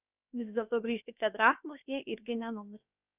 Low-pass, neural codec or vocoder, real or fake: 3.6 kHz; codec, 16 kHz, about 1 kbps, DyCAST, with the encoder's durations; fake